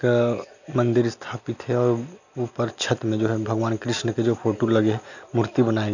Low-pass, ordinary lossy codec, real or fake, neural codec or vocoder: 7.2 kHz; none; real; none